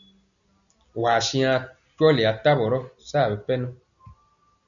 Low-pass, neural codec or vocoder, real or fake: 7.2 kHz; none; real